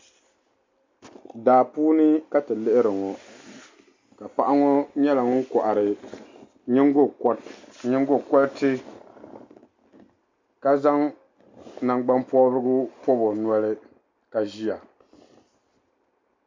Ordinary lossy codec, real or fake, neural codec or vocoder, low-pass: MP3, 64 kbps; real; none; 7.2 kHz